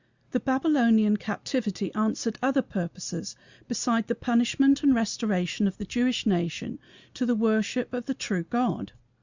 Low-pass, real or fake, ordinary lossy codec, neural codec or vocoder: 7.2 kHz; real; Opus, 64 kbps; none